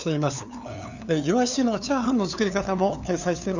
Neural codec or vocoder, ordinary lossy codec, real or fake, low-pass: codec, 16 kHz, 2 kbps, FunCodec, trained on LibriTTS, 25 frames a second; none; fake; 7.2 kHz